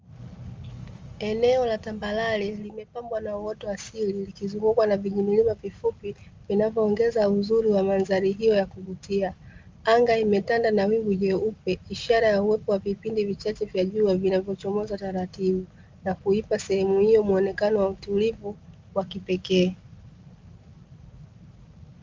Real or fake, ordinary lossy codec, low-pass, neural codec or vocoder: real; Opus, 32 kbps; 7.2 kHz; none